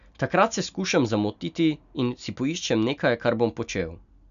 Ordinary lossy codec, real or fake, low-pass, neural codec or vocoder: none; real; 7.2 kHz; none